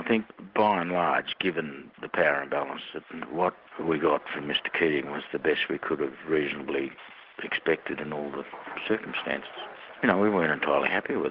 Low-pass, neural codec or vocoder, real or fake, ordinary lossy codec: 5.4 kHz; none; real; Opus, 16 kbps